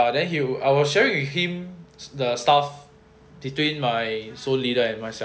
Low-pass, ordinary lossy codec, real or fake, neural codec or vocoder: none; none; real; none